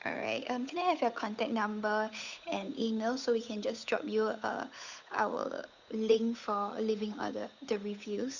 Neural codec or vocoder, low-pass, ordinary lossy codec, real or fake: codec, 16 kHz, 8 kbps, FunCodec, trained on Chinese and English, 25 frames a second; 7.2 kHz; none; fake